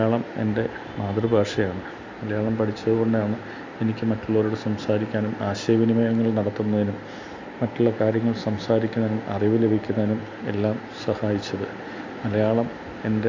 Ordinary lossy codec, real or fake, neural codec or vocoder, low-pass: MP3, 48 kbps; real; none; 7.2 kHz